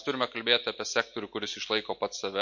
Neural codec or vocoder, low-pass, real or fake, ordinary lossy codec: none; 7.2 kHz; real; MP3, 48 kbps